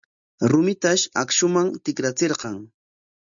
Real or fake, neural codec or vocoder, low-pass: real; none; 7.2 kHz